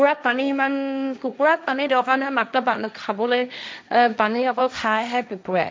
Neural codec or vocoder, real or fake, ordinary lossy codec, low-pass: codec, 16 kHz, 1.1 kbps, Voila-Tokenizer; fake; none; none